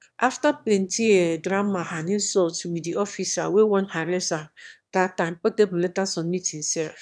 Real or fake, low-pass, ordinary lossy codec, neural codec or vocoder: fake; none; none; autoencoder, 22.05 kHz, a latent of 192 numbers a frame, VITS, trained on one speaker